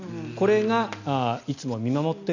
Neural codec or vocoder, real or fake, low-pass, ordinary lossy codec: none; real; 7.2 kHz; none